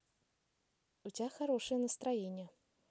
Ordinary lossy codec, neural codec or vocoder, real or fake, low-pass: none; none; real; none